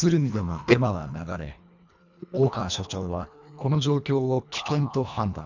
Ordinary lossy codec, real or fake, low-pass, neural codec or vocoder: none; fake; 7.2 kHz; codec, 24 kHz, 1.5 kbps, HILCodec